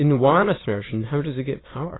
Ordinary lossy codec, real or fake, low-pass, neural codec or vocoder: AAC, 16 kbps; fake; 7.2 kHz; autoencoder, 22.05 kHz, a latent of 192 numbers a frame, VITS, trained on many speakers